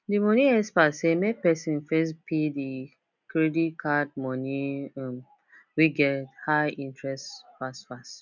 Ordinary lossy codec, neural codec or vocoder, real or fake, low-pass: none; none; real; 7.2 kHz